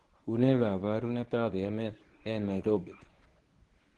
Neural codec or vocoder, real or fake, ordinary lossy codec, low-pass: codec, 24 kHz, 0.9 kbps, WavTokenizer, medium speech release version 1; fake; Opus, 16 kbps; 10.8 kHz